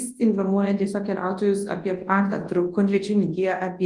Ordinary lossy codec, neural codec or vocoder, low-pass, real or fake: Opus, 24 kbps; codec, 24 kHz, 0.9 kbps, WavTokenizer, large speech release; 10.8 kHz; fake